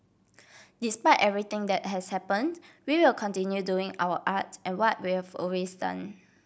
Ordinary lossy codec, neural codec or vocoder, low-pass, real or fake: none; none; none; real